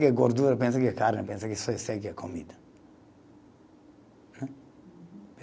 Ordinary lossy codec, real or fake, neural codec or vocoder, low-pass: none; real; none; none